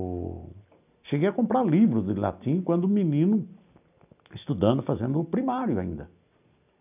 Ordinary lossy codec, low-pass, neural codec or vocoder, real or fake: none; 3.6 kHz; none; real